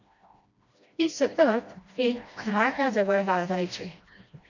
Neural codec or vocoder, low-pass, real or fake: codec, 16 kHz, 1 kbps, FreqCodec, smaller model; 7.2 kHz; fake